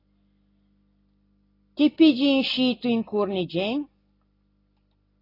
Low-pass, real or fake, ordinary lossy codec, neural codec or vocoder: 5.4 kHz; real; AAC, 24 kbps; none